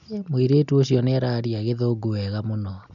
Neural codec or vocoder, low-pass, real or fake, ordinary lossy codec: none; 7.2 kHz; real; none